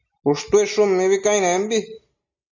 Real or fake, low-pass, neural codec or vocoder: real; 7.2 kHz; none